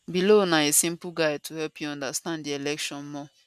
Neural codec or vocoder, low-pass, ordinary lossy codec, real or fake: none; 14.4 kHz; none; real